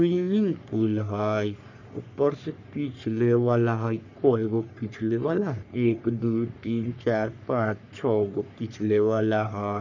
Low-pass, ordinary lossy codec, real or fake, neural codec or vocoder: 7.2 kHz; none; fake; codec, 44.1 kHz, 3.4 kbps, Pupu-Codec